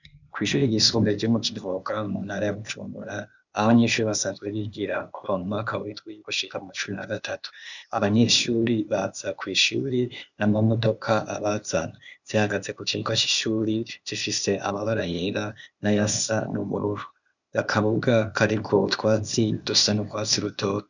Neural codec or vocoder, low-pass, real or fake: codec, 16 kHz, 0.8 kbps, ZipCodec; 7.2 kHz; fake